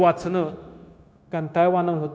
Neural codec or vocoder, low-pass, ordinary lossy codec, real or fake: codec, 16 kHz, 0.9 kbps, LongCat-Audio-Codec; none; none; fake